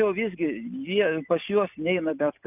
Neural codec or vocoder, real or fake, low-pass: none; real; 3.6 kHz